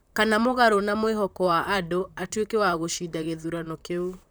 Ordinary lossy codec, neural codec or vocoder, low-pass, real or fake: none; vocoder, 44.1 kHz, 128 mel bands, Pupu-Vocoder; none; fake